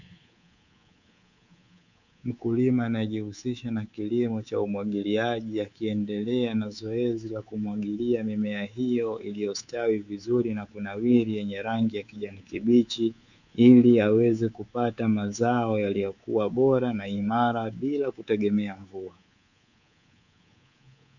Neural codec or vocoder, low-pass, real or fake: codec, 24 kHz, 3.1 kbps, DualCodec; 7.2 kHz; fake